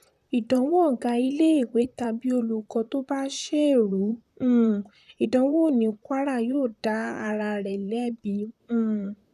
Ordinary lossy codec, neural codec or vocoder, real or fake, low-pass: none; vocoder, 44.1 kHz, 128 mel bands, Pupu-Vocoder; fake; 14.4 kHz